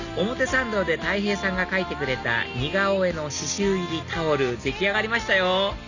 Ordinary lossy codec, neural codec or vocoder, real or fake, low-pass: none; none; real; 7.2 kHz